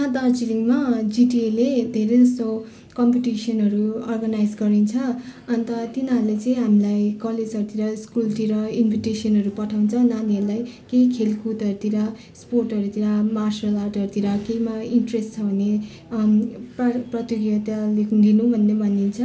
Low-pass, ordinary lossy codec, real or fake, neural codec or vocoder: none; none; real; none